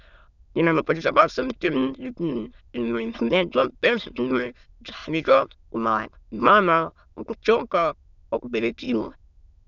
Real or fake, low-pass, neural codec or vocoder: fake; 7.2 kHz; autoencoder, 22.05 kHz, a latent of 192 numbers a frame, VITS, trained on many speakers